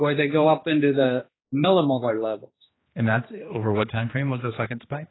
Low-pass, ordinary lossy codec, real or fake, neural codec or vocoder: 7.2 kHz; AAC, 16 kbps; fake; codec, 16 kHz, 2 kbps, X-Codec, HuBERT features, trained on general audio